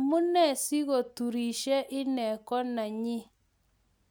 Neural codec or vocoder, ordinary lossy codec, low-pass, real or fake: none; none; none; real